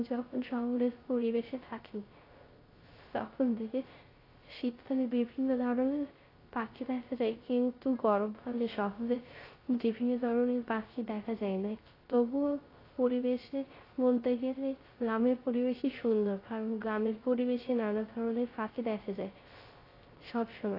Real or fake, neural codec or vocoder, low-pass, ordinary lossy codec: fake; codec, 16 kHz, 0.3 kbps, FocalCodec; 5.4 kHz; AAC, 24 kbps